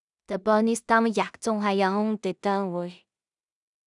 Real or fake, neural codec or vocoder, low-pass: fake; codec, 16 kHz in and 24 kHz out, 0.4 kbps, LongCat-Audio-Codec, two codebook decoder; 10.8 kHz